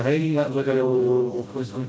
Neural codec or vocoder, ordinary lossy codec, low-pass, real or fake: codec, 16 kHz, 0.5 kbps, FreqCodec, smaller model; none; none; fake